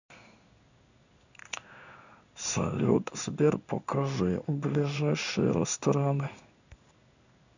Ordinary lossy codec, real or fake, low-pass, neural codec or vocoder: none; fake; 7.2 kHz; codec, 16 kHz in and 24 kHz out, 1 kbps, XY-Tokenizer